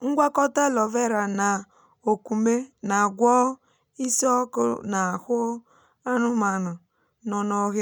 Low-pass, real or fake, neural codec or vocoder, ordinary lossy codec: none; real; none; none